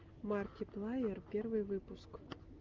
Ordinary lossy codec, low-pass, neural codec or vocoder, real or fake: Opus, 24 kbps; 7.2 kHz; none; real